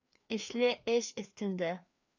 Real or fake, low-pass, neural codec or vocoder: fake; 7.2 kHz; codec, 16 kHz, 2 kbps, FreqCodec, larger model